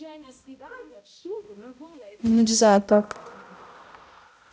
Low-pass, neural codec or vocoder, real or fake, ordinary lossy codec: none; codec, 16 kHz, 0.5 kbps, X-Codec, HuBERT features, trained on balanced general audio; fake; none